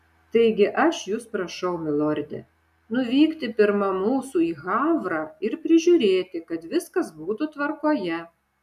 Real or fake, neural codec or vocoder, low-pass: real; none; 14.4 kHz